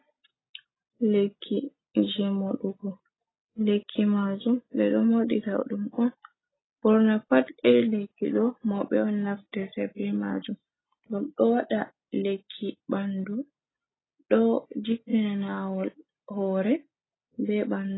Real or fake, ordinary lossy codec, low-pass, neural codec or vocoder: real; AAC, 16 kbps; 7.2 kHz; none